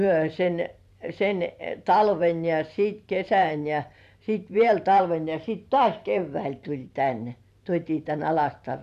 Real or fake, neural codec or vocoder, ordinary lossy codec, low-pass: fake; vocoder, 44.1 kHz, 128 mel bands every 512 samples, BigVGAN v2; none; 14.4 kHz